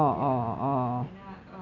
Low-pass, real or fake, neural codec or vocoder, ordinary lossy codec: 7.2 kHz; real; none; none